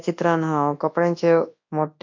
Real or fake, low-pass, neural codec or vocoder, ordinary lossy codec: fake; 7.2 kHz; codec, 24 kHz, 0.9 kbps, WavTokenizer, large speech release; MP3, 48 kbps